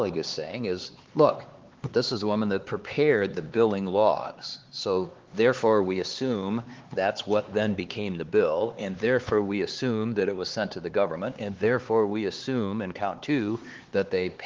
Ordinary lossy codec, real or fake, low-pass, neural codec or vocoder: Opus, 16 kbps; fake; 7.2 kHz; codec, 16 kHz, 4 kbps, X-Codec, HuBERT features, trained on LibriSpeech